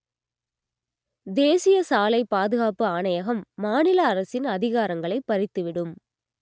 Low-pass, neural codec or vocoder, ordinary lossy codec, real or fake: none; none; none; real